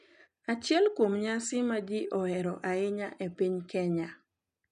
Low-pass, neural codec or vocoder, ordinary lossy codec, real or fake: 9.9 kHz; none; none; real